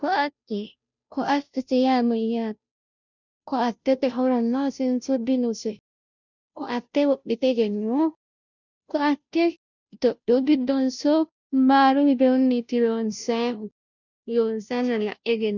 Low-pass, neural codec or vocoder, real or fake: 7.2 kHz; codec, 16 kHz, 0.5 kbps, FunCodec, trained on Chinese and English, 25 frames a second; fake